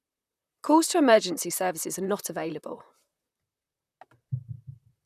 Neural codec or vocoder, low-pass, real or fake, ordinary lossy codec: vocoder, 44.1 kHz, 128 mel bands, Pupu-Vocoder; 14.4 kHz; fake; none